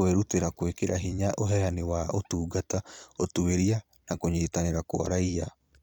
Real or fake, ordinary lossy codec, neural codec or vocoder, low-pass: fake; none; vocoder, 44.1 kHz, 128 mel bands every 256 samples, BigVGAN v2; none